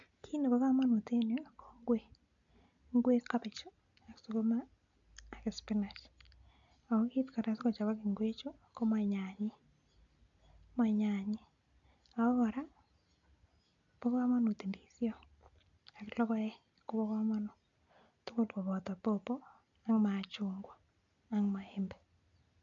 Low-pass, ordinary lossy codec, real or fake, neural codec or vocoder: 7.2 kHz; none; real; none